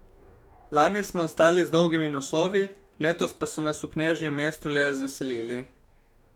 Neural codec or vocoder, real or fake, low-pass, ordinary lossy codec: codec, 44.1 kHz, 2.6 kbps, DAC; fake; 19.8 kHz; none